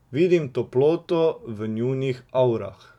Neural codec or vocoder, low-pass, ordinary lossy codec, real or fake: vocoder, 44.1 kHz, 128 mel bands every 256 samples, BigVGAN v2; 19.8 kHz; none; fake